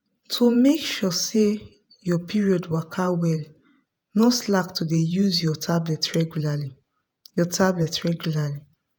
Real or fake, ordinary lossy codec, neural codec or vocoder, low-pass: fake; none; vocoder, 48 kHz, 128 mel bands, Vocos; none